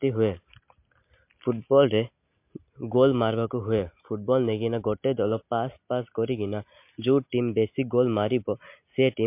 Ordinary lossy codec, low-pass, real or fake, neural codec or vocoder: MP3, 32 kbps; 3.6 kHz; real; none